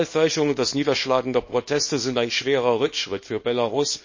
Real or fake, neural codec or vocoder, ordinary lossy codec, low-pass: fake; codec, 24 kHz, 0.9 kbps, WavTokenizer, small release; MP3, 32 kbps; 7.2 kHz